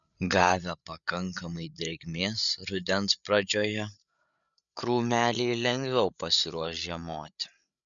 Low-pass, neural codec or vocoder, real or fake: 7.2 kHz; codec, 16 kHz, 8 kbps, FreqCodec, larger model; fake